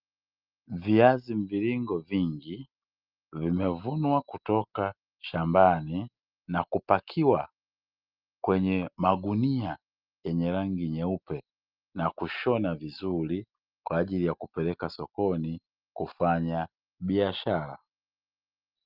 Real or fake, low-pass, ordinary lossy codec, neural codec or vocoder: real; 5.4 kHz; Opus, 24 kbps; none